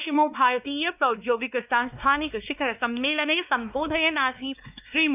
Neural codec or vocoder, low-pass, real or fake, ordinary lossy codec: codec, 16 kHz, 2 kbps, X-Codec, WavLM features, trained on Multilingual LibriSpeech; 3.6 kHz; fake; none